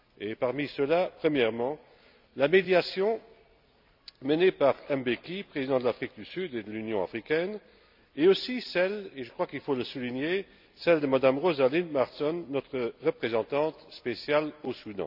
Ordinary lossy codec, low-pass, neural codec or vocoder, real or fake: none; 5.4 kHz; none; real